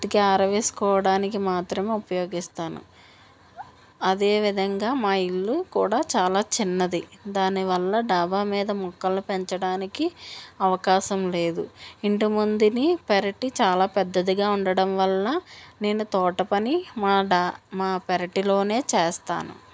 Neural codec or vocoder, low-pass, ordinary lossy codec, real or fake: none; none; none; real